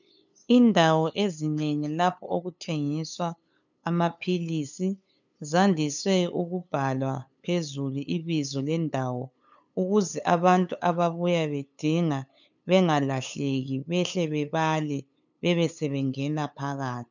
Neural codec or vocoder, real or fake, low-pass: codec, 16 kHz, 8 kbps, FunCodec, trained on LibriTTS, 25 frames a second; fake; 7.2 kHz